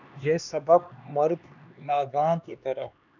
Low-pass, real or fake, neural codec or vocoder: 7.2 kHz; fake; codec, 16 kHz, 2 kbps, X-Codec, HuBERT features, trained on LibriSpeech